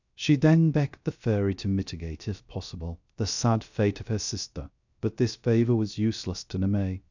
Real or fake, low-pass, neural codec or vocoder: fake; 7.2 kHz; codec, 16 kHz, 0.3 kbps, FocalCodec